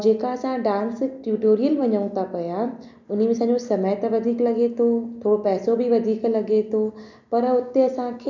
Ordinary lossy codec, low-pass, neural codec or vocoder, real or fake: none; 7.2 kHz; none; real